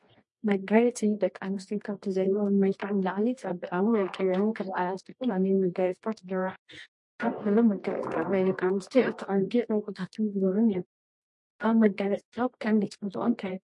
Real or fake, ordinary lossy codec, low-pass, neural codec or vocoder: fake; MP3, 48 kbps; 10.8 kHz; codec, 24 kHz, 0.9 kbps, WavTokenizer, medium music audio release